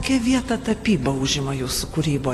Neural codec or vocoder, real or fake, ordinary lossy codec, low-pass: none; real; AAC, 48 kbps; 14.4 kHz